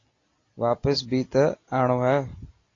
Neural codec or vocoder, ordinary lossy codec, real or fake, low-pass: none; AAC, 32 kbps; real; 7.2 kHz